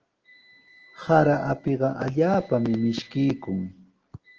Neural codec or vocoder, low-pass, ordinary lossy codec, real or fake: none; 7.2 kHz; Opus, 16 kbps; real